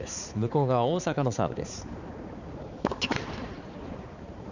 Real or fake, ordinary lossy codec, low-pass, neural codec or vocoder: fake; none; 7.2 kHz; codec, 16 kHz, 4 kbps, X-Codec, HuBERT features, trained on balanced general audio